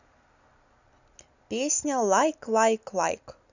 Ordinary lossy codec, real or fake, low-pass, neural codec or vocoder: none; real; 7.2 kHz; none